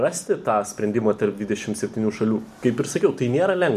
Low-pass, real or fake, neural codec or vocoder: 14.4 kHz; real; none